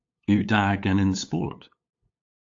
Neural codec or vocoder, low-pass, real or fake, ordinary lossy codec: codec, 16 kHz, 8 kbps, FunCodec, trained on LibriTTS, 25 frames a second; 7.2 kHz; fake; AAC, 48 kbps